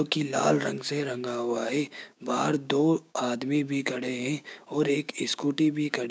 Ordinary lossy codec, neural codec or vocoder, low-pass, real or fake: none; codec, 16 kHz, 6 kbps, DAC; none; fake